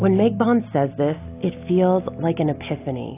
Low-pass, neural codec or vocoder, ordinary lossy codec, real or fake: 3.6 kHz; none; AAC, 24 kbps; real